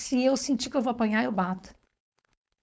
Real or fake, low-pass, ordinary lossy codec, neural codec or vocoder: fake; none; none; codec, 16 kHz, 4.8 kbps, FACodec